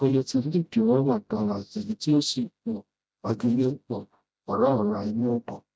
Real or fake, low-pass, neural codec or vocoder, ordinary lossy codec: fake; none; codec, 16 kHz, 0.5 kbps, FreqCodec, smaller model; none